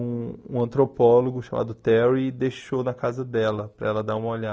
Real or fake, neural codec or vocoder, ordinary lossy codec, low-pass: real; none; none; none